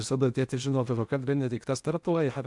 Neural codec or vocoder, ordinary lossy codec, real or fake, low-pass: codec, 16 kHz in and 24 kHz out, 0.8 kbps, FocalCodec, streaming, 65536 codes; MP3, 96 kbps; fake; 10.8 kHz